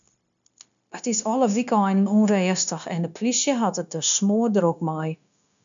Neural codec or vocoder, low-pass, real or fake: codec, 16 kHz, 0.9 kbps, LongCat-Audio-Codec; 7.2 kHz; fake